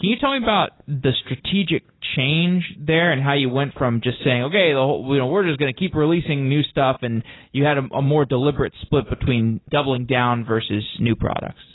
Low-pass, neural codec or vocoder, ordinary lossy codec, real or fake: 7.2 kHz; none; AAC, 16 kbps; real